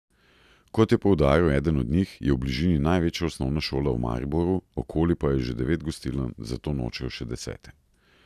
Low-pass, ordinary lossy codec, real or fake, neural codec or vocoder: 14.4 kHz; none; real; none